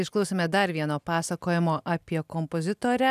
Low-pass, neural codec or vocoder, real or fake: 14.4 kHz; none; real